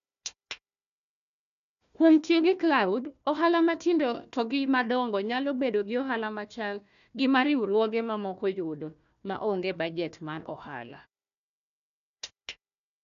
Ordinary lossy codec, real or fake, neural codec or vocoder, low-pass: none; fake; codec, 16 kHz, 1 kbps, FunCodec, trained on Chinese and English, 50 frames a second; 7.2 kHz